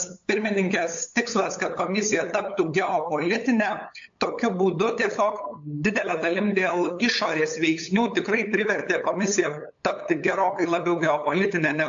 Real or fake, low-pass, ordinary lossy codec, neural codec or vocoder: fake; 7.2 kHz; AAC, 64 kbps; codec, 16 kHz, 8 kbps, FunCodec, trained on LibriTTS, 25 frames a second